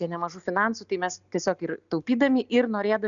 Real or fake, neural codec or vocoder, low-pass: real; none; 7.2 kHz